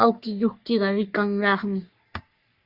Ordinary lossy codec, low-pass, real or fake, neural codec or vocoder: Opus, 64 kbps; 5.4 kHz; fake; codec, 44.1 kHz, 3.4 kbps, Pupu-Codec